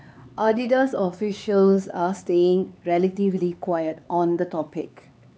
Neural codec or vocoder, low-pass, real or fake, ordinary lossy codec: codec, 16 kHz, 4 kbps, X-Codec, HuBERT features, trained on LibriSpeech; none; fake; none